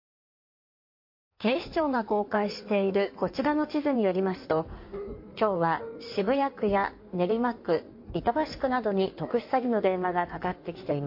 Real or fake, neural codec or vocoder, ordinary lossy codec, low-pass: fake; codec, 16 kHz in and 24 kHz out, 1.1 kbps, FireRedTTS-2 codec; MP3, 32 kbps; 5.4 kHz